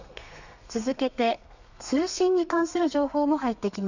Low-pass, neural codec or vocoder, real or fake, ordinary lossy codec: 7.2 kHz; codec, 32 kHz, 1.9 kbps, SNAC; fake; none